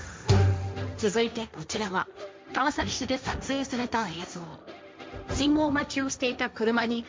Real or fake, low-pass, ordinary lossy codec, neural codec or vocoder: fake; none; none; codec, 16 kHz, 1.1 kbps, Voila-Tokenizer